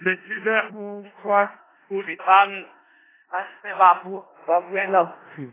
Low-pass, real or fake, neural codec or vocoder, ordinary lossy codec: 3.6 kHz; fake; codec, 16 kHz in and 24 kHz out, 0.4 kbps, LongCat-Audio-Codec, four codebook decoder; AAC, 16 kbps